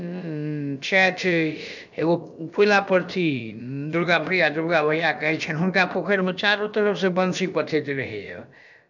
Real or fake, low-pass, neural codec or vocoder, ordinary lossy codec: fake; 7.2 kHz; codec, 16 kHz, about 1 kbps, DyCAST, with the encoder's durations; none